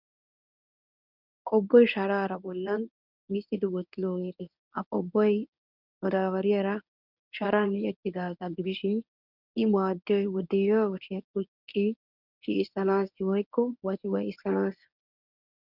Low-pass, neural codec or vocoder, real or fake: 5.4 kHz; codec, 24 kHz, 0.9 kbps, WavTokenizer, medium speech release version 1; fake